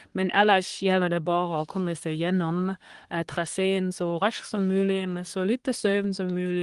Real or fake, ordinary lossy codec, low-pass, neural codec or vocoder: fake; Opus, 24 kbps; 10.8 kHz; codec, 24 kHz, 1 kbps, SNAC